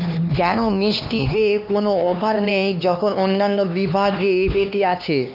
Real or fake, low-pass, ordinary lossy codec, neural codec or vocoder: fake; 5.4 kHz; none; codec, 16 kHz, 2 kbps, X-Codec, HuBERT features, trained on LibriSpeech